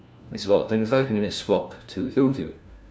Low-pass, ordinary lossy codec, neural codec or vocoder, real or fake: none; none; codec, 16 kHz, 1 kbps, FunCodec, trained on LibriTTS, 50 frames a second; fake